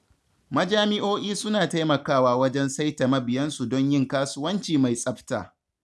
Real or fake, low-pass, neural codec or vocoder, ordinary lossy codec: real; none; none; none